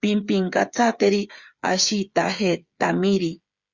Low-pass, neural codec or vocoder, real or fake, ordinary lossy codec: 7.2 kHz; codec, 16 kHz, 8 kbps, FreqCodec, smaller model; fake; Opus, 64 kbps